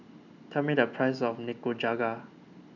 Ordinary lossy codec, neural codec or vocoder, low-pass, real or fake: none; none; 7.2 kHz; real